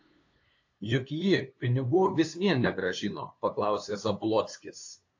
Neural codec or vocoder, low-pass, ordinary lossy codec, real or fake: codec, 16 kHz, 2 kbps, FunCodec, trained on LibriTTS, 25 frames a second; 7.2 kHz; AAC, 48 kbps; fake